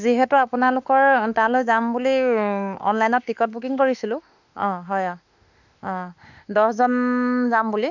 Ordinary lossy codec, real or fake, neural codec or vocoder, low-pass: none; fake; autoencoder, 48 kHz, 32 numbers a frame, DAC-VAE, trained on Japanese speech; 7.2 kHz